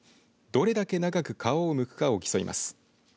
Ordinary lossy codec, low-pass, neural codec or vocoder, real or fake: none; none; none; real